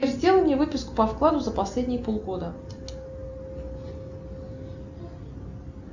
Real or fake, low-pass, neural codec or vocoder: real; 7.2 kHz; none